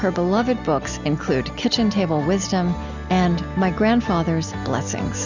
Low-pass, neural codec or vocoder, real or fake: 7.2 kHz; none; real